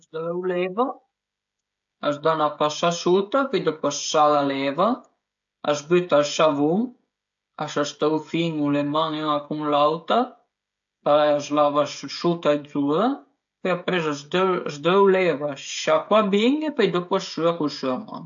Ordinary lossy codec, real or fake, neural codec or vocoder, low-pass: AAC, 64 kbps; fake; codec, 16 kHz, 16 kbps, FreqCodec, smaller model; 7.2 kHz